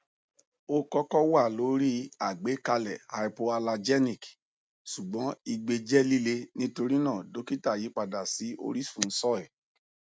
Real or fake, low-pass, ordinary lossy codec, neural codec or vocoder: real; none; none; none